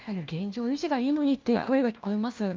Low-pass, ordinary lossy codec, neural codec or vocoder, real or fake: 7.2 kHz; Opus, 24 kbps; codec, 16 kHz, 1 kbps, FunCodec, trained on LibriTTS, 50 frames a second; fake